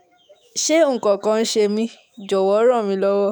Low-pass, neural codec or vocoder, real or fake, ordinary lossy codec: none; autoencoder, 48 kHz, 128 numbers a frame, DAC-VAE, trained on Japanese speech; fake; none